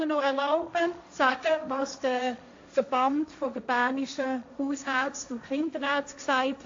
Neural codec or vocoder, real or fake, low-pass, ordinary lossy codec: codec, 16 kHz, 1.1 kbps, Voila-Tokenizer; fake; 7.2 kHz; AAC, 48 kbps